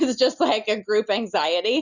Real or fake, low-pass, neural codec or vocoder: real; 7.2 kHz; none